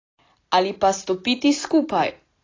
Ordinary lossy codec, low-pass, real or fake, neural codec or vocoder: AAC, 32 kbps; 7.2 kHz; real; none